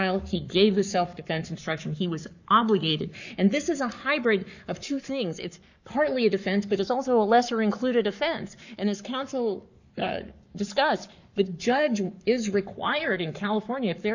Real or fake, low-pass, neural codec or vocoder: fake; 7.2 kHz; codec, 44.1 kHz, 7.8 kbps, Pupu-Codec